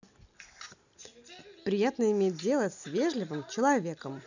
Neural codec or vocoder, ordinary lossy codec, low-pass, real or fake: none; none; 7.2 kHz; real